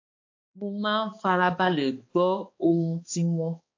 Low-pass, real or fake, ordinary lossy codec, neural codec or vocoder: 7.2 kHz; fake; AAC, 48 kbps; codec, 16 kHz, 2 kbps, X-Codec, HuBERT features, trained on balanced general audio